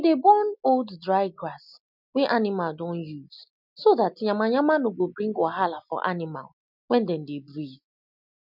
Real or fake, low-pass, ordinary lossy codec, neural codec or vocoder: real; 5.4 kHz; none; none